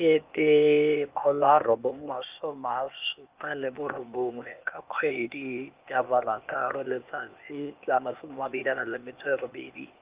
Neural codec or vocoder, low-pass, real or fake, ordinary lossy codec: codec, 16 kHz, 0.8 kbps, ZipCodec; 3.6 kHz; fake; Opus, 24 kbps